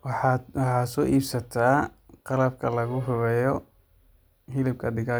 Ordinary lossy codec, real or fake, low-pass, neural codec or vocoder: none; real; none; none